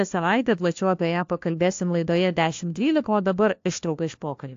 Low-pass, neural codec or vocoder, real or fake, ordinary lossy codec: 7.2 kHz; codec, 16 kHz, 1 kbps, FunCodec, trained on LibriTTS, 50 frames a second; fake; AAC, 48 kbps